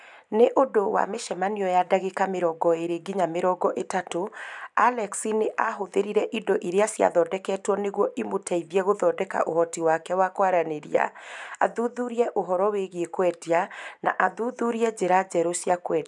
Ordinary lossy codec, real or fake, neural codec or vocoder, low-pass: none; real; none; 10.8 kHz